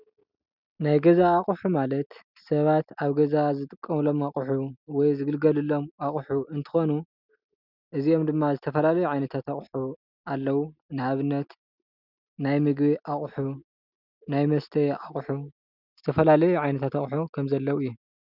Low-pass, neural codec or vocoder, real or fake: 5.4 kHz; none; real